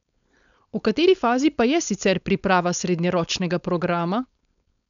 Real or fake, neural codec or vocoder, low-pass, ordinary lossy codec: fake; codec, 16 kHz, 4.8 kbps, FACodec; 7.2 kHz; none